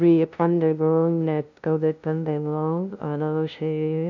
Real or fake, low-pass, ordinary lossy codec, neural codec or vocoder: fake; 7.2 kHz; none; codec, 16 kHz, 0.5 kbps, FunCodec, trained on LibriTTS, 25 frames a second